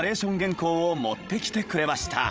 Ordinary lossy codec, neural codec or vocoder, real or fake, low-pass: none; codec, 16 kHz, 16 kbps, FreqCodec, larger model; fake; none